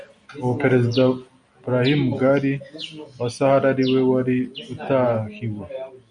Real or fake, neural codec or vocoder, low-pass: real; none; 9.9 kHz